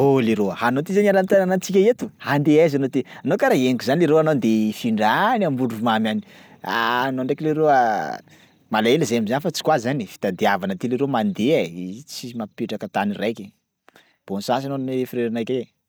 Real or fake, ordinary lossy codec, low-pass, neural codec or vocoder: real; none; none; none